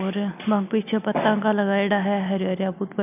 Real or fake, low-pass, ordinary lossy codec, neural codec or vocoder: real; 3.6 kHz; none; none